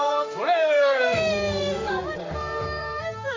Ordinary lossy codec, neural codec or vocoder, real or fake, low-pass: AAC, 32 kbps; codec, 16 kHz in and 24 kHz out, 1 kbps, XY-Tokenizer; fake; 7.2 kHz